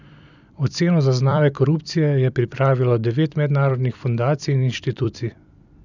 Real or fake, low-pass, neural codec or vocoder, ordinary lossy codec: fake; 7.2 kHz; vocoder, 44.1 kHz, 128 mel bands every 256 samples, BigVGAN v2; none